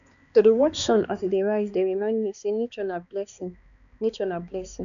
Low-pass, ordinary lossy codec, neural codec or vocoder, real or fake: 7.2 kHz; none; codec, 16 kHz, 2 kbps, X-Codec, HuBERT features, trained on balanced general audio; fake